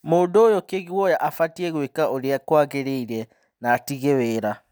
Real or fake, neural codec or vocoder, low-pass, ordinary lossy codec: real; none; none; none